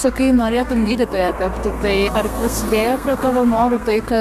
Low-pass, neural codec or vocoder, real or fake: 14.4 kHz; codec, 32 kHz, 1.9 kbps, SNAC; fake